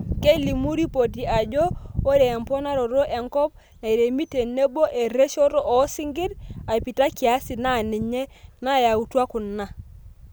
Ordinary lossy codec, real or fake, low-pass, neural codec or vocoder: none; real; none; none